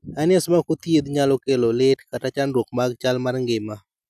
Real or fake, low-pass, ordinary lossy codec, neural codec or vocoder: real; 14.4 kHz; none; none